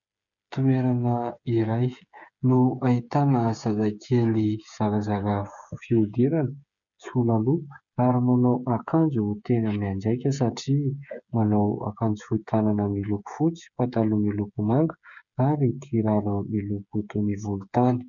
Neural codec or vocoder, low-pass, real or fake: codec, 16 kHz, 8 kbps, FreqCodec, smaller model; 7.2 kHz; fake